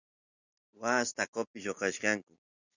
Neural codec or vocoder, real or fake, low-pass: none; real; 7.2 kHz